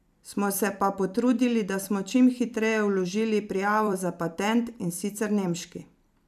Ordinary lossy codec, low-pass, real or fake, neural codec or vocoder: none; 14.4 kHz; fake; vocoder, 44.1 kHz, 128 mel bands every 256 samples, BigVGAN v2